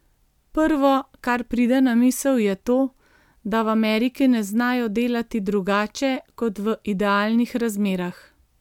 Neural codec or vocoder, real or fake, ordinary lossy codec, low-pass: none; real; MP3, 96 kbps; 19.8 kHz